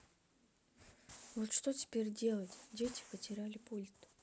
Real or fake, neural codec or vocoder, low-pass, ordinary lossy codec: real; none; none; none